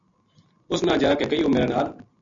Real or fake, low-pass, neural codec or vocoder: real; 7.2 kHz; none